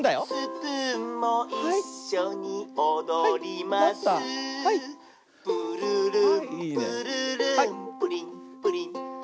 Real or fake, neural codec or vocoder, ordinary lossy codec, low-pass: real; none; none; none